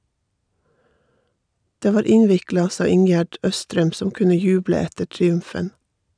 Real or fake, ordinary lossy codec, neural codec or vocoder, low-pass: real; none; none; 9.9 kHz